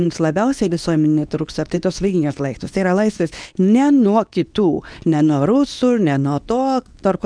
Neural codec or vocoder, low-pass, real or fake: codec, 24 kHz, 0.9 kbps, WavTokenizer, medium speech release version 2; 9.9 kHz; fake